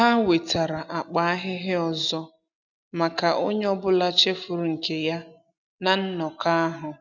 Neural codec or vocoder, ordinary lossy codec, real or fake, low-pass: none; none; real; 7.2 kHz